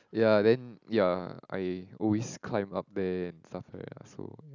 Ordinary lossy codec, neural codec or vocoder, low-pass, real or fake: none; none; 7.2 kHz; real